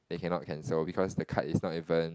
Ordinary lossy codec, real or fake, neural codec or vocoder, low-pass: none; real; none; none